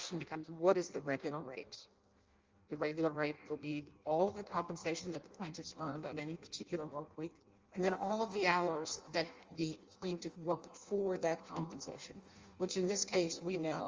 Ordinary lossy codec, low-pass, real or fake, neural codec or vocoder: Opus, 32 kbps; 7.2 kHz; fake; codec, 16 kHz in and 24 kHz out, 0.6 kbps, FireRedTTS-2 codec